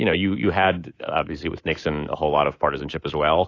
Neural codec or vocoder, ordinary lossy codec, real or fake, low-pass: codec, 24 kHz, 3.1 kbps, DualCodec; AAC, 32 kbps; fake; 7.2 kHz